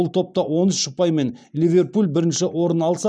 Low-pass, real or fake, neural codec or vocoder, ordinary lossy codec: none; real; none; none